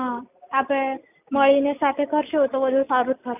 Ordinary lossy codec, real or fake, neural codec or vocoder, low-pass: none; real; none; 3.6 kHz